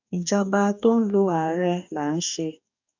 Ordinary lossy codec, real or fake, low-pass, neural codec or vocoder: none; fake; 7.2 kHz; codec, 44.1 kHz, 2.6 kbps, DAC